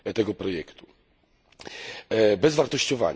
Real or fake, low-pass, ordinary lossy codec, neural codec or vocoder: real; none; none; none